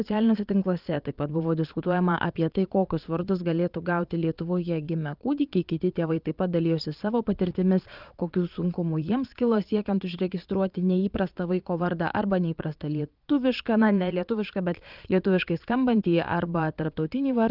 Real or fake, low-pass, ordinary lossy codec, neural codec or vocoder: fake; 5.4 kHz; Opus, 24 kbps; vocoder, 22.05 kHz, 80 mel bands, Vocos